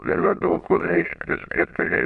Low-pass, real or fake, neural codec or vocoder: 9.9 kHz; fake; autoencoder, 22.05 kHz, a latent of 192 numbers a frame, VITS, trained on many speakers